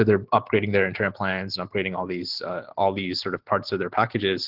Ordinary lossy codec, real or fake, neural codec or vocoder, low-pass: Opus, 16 kbps; real; none; 5.4 kHz